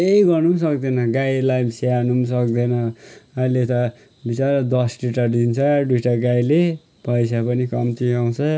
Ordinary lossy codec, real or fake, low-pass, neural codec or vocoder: none; real; none; none